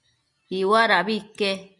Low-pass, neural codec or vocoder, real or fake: 10.8 kHz; none; real